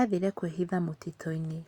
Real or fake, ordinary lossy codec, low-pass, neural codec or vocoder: real; Opus, 64 kbps; 19.8 kHz; none